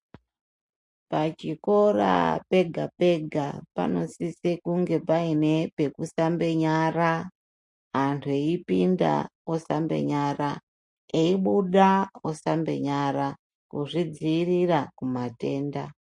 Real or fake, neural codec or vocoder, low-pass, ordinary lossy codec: real; none; 10.8 kHz; MP3, 48 kbps